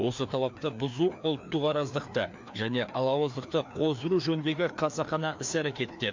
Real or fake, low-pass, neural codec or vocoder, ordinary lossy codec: fake; 7.2 kHz; codec, 16 kHz, 2 kbps, FreqCodec, larger model; MP3, 48 kbps